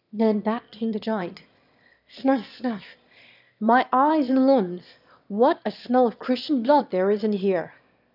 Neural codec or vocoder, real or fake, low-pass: autoencoder, 22.05 kHz, a latent of 192 numbers a frame, VITS, trained on one speaker; fake; 5.4 kHz